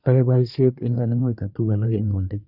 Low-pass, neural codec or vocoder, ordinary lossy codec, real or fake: 5.4 kHz; codec, 24 kHz, 1 kbps, SNAC; none; fake